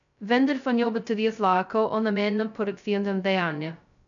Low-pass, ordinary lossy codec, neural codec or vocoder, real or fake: 7.2 kHz; none; codec, 16 kHz, 0.2 kbps, FocalCodec; fake